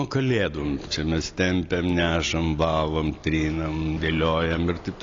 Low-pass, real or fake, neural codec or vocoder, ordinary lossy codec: 7.2 kHz; real; none; AAC, 32 kbps